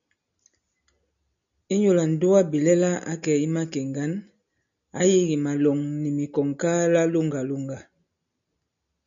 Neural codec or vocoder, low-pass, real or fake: none; 7.2 kHz; real